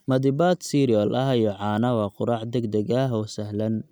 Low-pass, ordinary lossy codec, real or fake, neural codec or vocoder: none; none; real; none